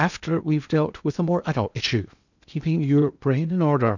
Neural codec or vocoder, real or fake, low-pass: codec, 16 kHz in and 24 kHz out, 0.8 kbps, FocalCodec, streaming, 65536 codes; fake; 7.2 kHz